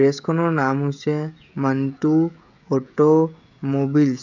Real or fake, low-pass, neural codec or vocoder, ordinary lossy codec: fake; 7.2 kHz; codec, 16 kHz, 16 kbps, FreqCodec, smaller model; none